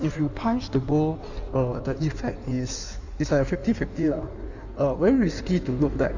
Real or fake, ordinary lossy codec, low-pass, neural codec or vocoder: fake; none; 7.2 kHz; codec, 16 kHz in and 24 kHz out, 1.1 kbps, FireRedTTS-2 codec